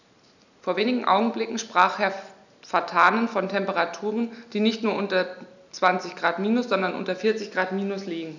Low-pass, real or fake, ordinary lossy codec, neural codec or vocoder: 7.2 kHz; real; none; none